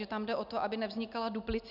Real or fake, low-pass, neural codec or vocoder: real; 5.4 kHz; none